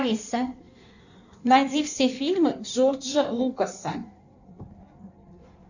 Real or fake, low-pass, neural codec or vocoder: fake; 7.2 kHz; codec, 16 kHz in and 24 kHz out, 1.1 kbps, FireRedTTS-2 codec